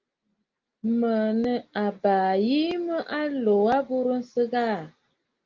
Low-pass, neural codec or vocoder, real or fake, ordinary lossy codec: 7.2 kHz; none; real; Opus, 24 kbps